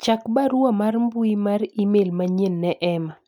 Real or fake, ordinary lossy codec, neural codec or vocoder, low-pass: real; none; none; 19.8 kHz